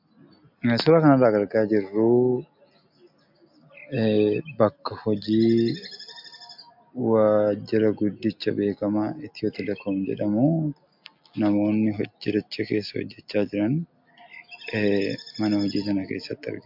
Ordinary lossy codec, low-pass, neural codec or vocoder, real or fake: MP3, 48 kbps; 5.4 kHz; none; real